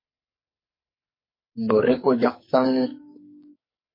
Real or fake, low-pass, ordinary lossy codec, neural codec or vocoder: fake; 5.4 kHz; MP3, 24 kbps; codec, 44.1 kHz, 2.6 kbps, SNAC